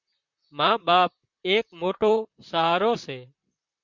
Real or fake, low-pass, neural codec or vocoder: fake; 7.2 kHz; vocoder, 44.1 kHz, 128 mel bands, Pupu-Vocoder